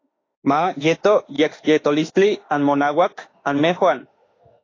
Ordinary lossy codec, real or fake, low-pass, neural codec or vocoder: AAC, 32 kbps; fake; 7.2 kHz; autoencoder, 48 kHz, 32 numbers a frame, DAC-VAE, trained on Japanese speech